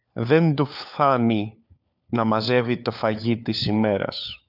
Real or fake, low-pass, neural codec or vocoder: fake; 5.4 kHz; codec, 16 kHz, 4 kbps, FunCodec, trained on LibriTTS, 50 frames a second